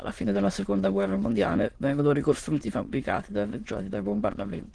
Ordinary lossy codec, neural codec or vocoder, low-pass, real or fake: Opus, 16 kbps; autoencoder, 22.05 kHz, a latent of 192 numbers a frame, VITS, trained on many speakers; 9.9 kHz; fake